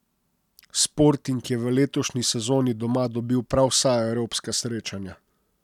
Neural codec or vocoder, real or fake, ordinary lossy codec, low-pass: none; real; none; 19.8 kHz